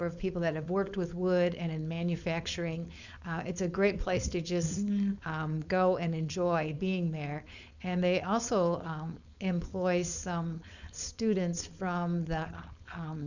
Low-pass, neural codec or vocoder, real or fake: 7.2 kHz; codec, 16 kHz, 4.8 kbps, FACodec; fake